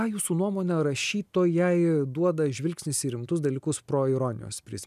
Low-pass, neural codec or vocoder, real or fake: 14.4 kHz; none; real